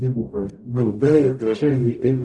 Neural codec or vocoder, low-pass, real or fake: codec, 44.1 kHz, 0.9 kbps, DAC; 10.8 kHz; fake